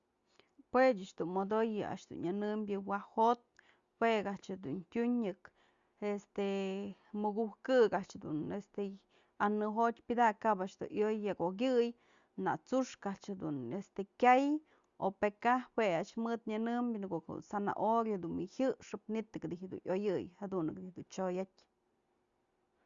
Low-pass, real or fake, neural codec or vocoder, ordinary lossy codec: 7.2 kHz; real; none; Opus, 64 kbps